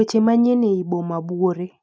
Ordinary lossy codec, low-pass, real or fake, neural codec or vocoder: none; none; real; none